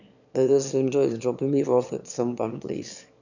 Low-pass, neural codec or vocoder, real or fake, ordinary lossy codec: 7.2 kHz; autoencoder, 22.05 kHz, a latent of 192 numbers a frame, VITS, trained on one speaker; fake; none